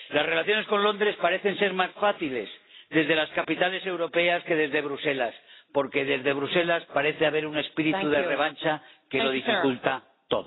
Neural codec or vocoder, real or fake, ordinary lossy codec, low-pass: none; real; AAC, 16 kbps; 7.2 kHz